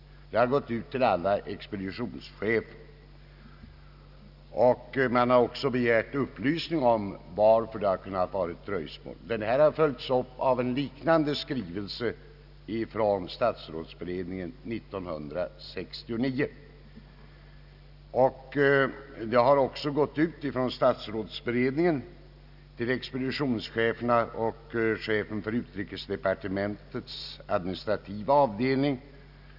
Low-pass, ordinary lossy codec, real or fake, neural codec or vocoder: 5.4 kHz; none; real; none